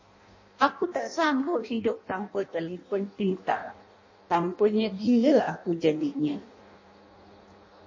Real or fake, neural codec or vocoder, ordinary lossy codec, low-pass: fake; codec, 16 kHz in and 24 kHz out, 0.6 kbps, FireRedTTS-2 codec; MP3, 32 kbps; 7.2 kHz